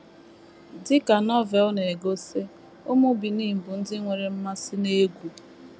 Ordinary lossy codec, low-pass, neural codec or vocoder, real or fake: none; none; none; real